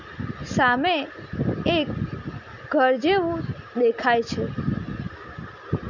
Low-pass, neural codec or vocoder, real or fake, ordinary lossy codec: 7.2 kHz; none; real; none